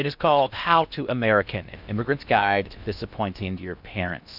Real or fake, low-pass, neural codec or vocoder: fake; 5.4 kHz; codec, 16 kHz in and 24 kHz out, 0.6 kbps, FocalCodec, streaming, 2048 codes